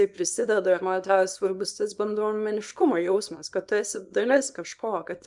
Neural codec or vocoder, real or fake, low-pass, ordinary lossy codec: codec, 24 kHz, 0.9 kbps, WavTokenizer, small release; fake; 10.8 kHz; MP3, 96 kbps